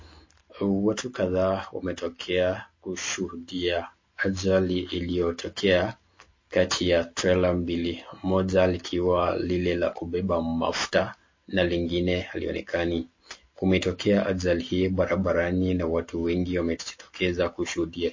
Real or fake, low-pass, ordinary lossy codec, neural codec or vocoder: real; 7.2 kHz; MP3, 32 kbps; none